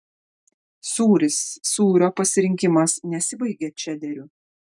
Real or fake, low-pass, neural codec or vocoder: real; 10.8 kHz; none